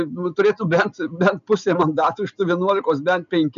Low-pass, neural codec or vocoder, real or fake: 7.2 kHz; none; real